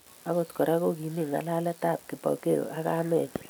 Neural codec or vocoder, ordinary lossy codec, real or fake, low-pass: none; none; real; none